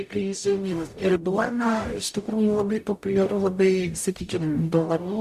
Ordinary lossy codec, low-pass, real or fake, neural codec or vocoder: Opus, 64 kbps; 14.4 kHz; fake; codec, 44.1 kHz, 0.9 kbps, DAC